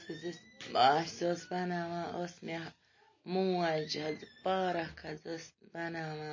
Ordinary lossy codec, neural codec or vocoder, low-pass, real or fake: MP3, 32 kbps; none; 7.2 kHz; real